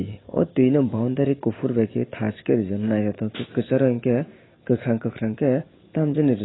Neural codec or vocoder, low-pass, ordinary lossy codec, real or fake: none; 7.2 kHz; AAC, 16 kbps; real